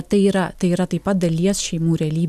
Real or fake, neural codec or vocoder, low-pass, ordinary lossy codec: real; none; 14.4 kHz; MP3, 96 kbps